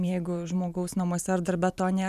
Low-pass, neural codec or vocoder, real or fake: 14.4 kHz; vocoder, 44.1 kHz, 128 mel bands every 512 samples, BigVGAN v2; fake